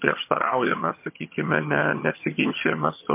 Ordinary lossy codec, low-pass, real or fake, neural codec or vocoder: MP3, 24 kbps; 3.6 kHz; fake; vocoder, 22.05 kHz, 80 mel bands, HiFi-GAN